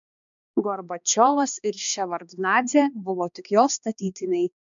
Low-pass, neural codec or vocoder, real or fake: 7.2 kHz; codec, 16 kHz, 2 kbps, X-Codec, HuBERT features, trained on balanced general audio; fake